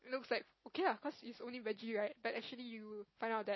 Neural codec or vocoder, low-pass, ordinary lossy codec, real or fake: none; 7.2 kHz; MP3, 24 kbps; real